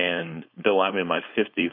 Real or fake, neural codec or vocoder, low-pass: fake; codec, 16 kHz, 4.8 kbps, FACodec; 5.4 kHz